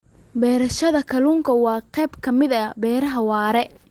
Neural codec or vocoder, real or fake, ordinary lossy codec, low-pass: none; real; Opus, 16 kbps; 19.8 kHz